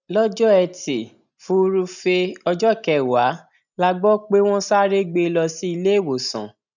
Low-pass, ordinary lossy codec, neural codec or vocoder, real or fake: 7.2 kHz; none; none; real